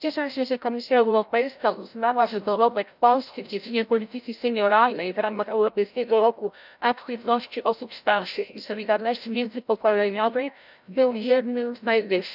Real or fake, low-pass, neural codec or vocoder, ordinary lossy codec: fake; 5.4 kHz; codec, 16 kHz, 0.5 kbps, FreqCodec, larger model; none